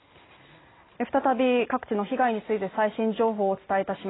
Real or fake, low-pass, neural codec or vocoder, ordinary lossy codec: real; 7.2 kHz; none; AAC, 16 kbps